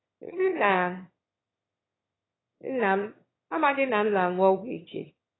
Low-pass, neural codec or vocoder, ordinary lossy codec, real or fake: 7.2 kHz; autoencoder, 22.05 kHz, a latent of 192 numbers a frame, VITS, trained on one speaker; AAC, 16 kbps; fake